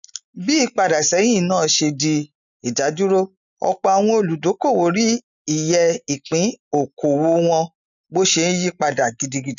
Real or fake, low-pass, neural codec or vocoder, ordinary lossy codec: real; 7.2 kHz; none; none